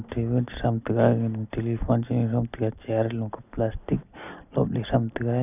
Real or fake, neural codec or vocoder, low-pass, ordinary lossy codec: real; none; 3.6 kHz; none